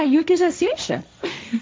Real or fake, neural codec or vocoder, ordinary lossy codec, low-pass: fake; codec, 16 kHz, 1.1 kbps, Voila-Tokenizer; none; none